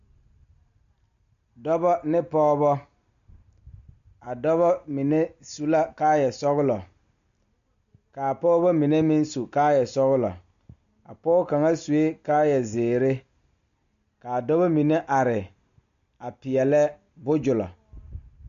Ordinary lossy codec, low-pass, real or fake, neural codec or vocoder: MP3, 64 kbps; 7.2 kHz; real; none